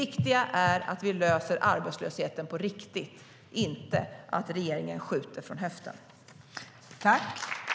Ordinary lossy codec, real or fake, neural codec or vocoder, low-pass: none; real; none; none